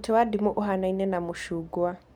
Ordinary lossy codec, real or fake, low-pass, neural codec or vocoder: none; real; 19.8 kHz; none